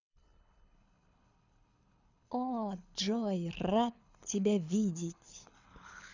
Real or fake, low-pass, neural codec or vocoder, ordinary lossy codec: fake; 7.2 kHz; codec, 24 kHz, 6 kbps, HILCodec; none